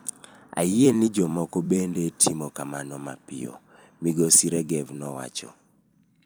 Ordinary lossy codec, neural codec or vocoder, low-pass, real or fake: none; vocoder, 44.1 kHz, 128 mel bands every 256 samples, BigVGAN v2; none; fake